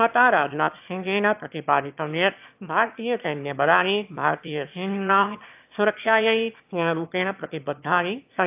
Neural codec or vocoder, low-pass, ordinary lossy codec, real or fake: autoencoder, 22.05 kHz, a latent of 192 numbers a frame, VITS, trained on one speaker; 3.6 kHz; AAC, 32 kbps; fake